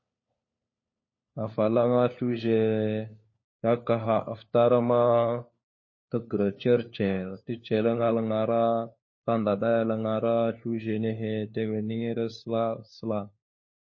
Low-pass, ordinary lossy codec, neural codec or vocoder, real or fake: 7.2 kHz; MP3, 32 kbps; codec, 16 kHz, 4 kbps, FunCodec, trained on LibriTTS, 50 frames a second; fake